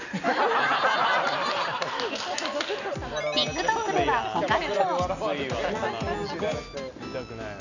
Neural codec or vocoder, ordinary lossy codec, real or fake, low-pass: none; none; real; 7.2 kHz